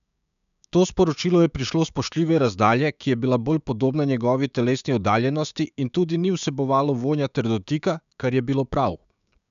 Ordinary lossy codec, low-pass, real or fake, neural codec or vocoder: none; 7.2 kHz; fake; codec, 16 kHz, 6 kbps, DAC